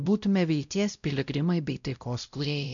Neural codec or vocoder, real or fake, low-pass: codec, 16 kHz, 0.5 kbps, X-Codec, WavLM features, trained on Multilingual LibriSpeech; fake; 7.2 kHz